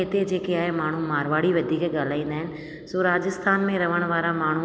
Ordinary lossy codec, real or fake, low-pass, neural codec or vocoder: none; real; none; none